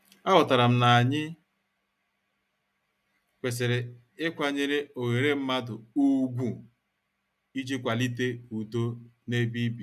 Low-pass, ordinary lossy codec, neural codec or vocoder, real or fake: 14.4 kHz; none; none; real